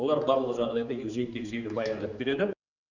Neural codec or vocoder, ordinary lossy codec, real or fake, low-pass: codec, 16 kHz, 4 kbps, X-Codec, HuBERT features, trained on general audio; none; fake; 7.2 kHz